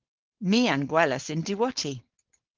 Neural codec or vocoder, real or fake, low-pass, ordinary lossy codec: codec, 16 kHz, 4.8 kbps, FACodec; fake; 7.2 kHz; Opus, 16 kbps